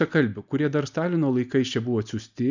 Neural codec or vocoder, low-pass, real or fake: none; 7.2 kHz; real